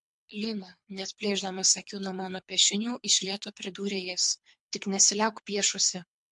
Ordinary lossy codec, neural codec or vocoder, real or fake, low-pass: MP3, 64 kbps; codec, 24 kHz, 3 kbps, HILCodec; fake; 10.8 kHz